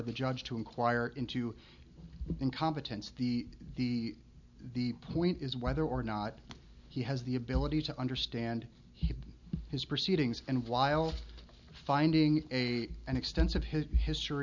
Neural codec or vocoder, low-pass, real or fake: none; 7.2 kHz; real